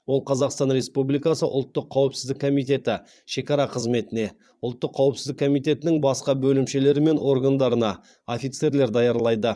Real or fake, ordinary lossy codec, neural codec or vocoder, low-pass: fake; none; vocoder, 22.05 kHz, 80 mel bands, Vocos; none